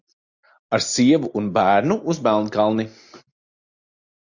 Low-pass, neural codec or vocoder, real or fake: 7.2 kHz; none; real